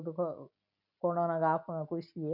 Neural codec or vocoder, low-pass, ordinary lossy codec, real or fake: none; 5.4 kHz; none; real